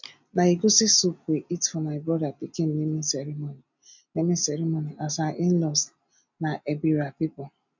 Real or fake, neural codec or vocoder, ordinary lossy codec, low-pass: real; none; none; 7.2 kHz